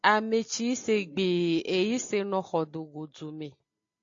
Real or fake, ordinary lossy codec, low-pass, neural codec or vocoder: real; AAC, 48 kbps; 7.2 kHz; none